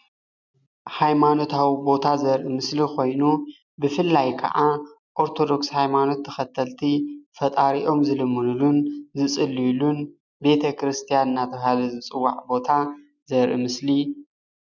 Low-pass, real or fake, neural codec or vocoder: 7.2 kHz; real; none